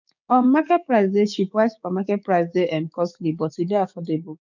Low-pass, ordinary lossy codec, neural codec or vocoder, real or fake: 7.2 kHz; none; codec, 16 kHz, 6 kbps, DAC; fake